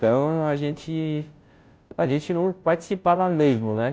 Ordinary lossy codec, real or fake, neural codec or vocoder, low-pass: none; fake; codec, 16 kHz, 0.5 kbps, FunCodec, trained on Chinese and English, 25 frames a second; none